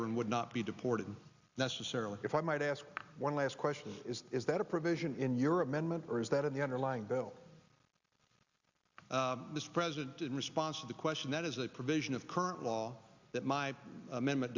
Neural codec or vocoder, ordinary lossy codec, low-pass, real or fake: none; Opus, 64 kbps; 7.2 kHz; real